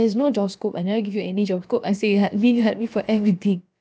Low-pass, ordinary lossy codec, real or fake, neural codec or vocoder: none; none; fake; codec, 16 kHz, about 1 kbps, DyCAST, with the encoder's durations